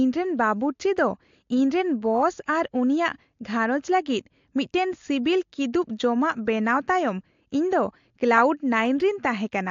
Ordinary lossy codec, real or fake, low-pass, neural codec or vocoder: AAC, 48 kbps; real; 7.2 kHz; none